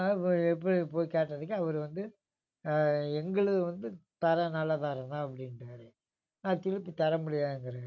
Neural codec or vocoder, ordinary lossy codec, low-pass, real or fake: none; none; 7.2 kHz; real